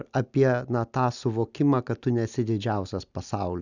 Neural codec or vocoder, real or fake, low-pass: none; real; 7.2 kHz